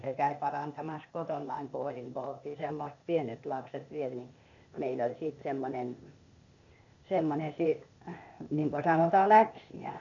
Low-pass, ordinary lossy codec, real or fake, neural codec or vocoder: 7.2 kHz; none; fake; codec, 16 kHz, 0.8 kbps, ZipCodec